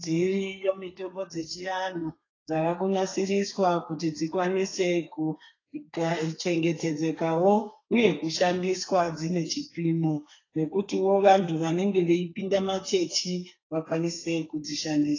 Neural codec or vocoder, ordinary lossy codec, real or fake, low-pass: codec, 32 kHz, 1.9 kbps, SNAC; AAC, 32 kbps; fake; 7.2 kHz